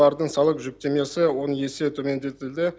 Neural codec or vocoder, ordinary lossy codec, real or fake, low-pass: none; none; real; none